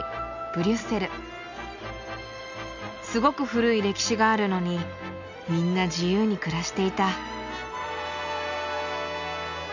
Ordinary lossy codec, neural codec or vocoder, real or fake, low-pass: none; none; real; 7.2 kHz